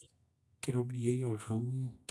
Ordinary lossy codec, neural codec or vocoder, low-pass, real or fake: none; codec, 24 kHz, 0.9 kbps, WavTokenizer, medium music audio release; none; fake